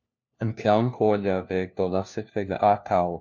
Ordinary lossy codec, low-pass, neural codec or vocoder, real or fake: MP3, 64 kbps; 7.2 kHz; codec, 16 kHz, 1 kbps, FunCodec, trained on LibriTTS, 50 frames a second; fake